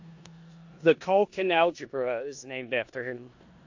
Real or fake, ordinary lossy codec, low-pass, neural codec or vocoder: fake; AAC, 48 kbps; 7.2 kHz; codec, 16 kHz in and 24 kHz out, 0.9 kbps, LongCat-Audio-Codec, four codebook decoder